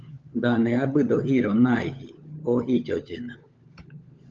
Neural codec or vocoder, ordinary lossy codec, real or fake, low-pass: codec, 16 kHz, 16 kbps, FunCodec, trained on LibriTTS, 50 frames a second; Opus, 24 kbps; fake; 7.2 kHz